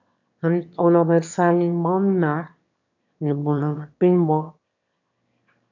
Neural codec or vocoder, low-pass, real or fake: autoencoder, 22.05 kHz, a latent of 192 numbers a frame, VITS, trained on one speaker; 7.2 kHz; fake